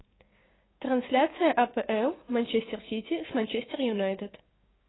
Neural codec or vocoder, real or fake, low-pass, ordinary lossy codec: codec, 16 kHz, 6 kbps, DAC; fake; 7.2 kHz; AAC, 16 kbps